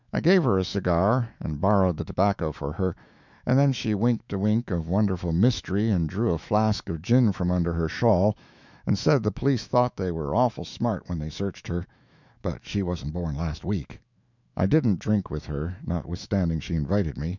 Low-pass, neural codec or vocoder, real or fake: 7.2 kHz; autoencoder, 48 kHz, 128 numbers a frame, DAC-VAE, trained on Japanese speech; fake